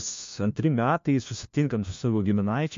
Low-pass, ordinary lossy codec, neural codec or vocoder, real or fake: 7.2 kHz; MP3, 48 kbps; codec, 16 kHz, 1 kbps, FunCodec, trained on LibriTTS, 50 frames a second; fake